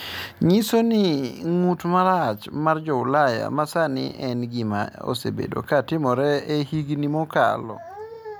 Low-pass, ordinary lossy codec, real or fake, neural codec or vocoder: none; none; real; none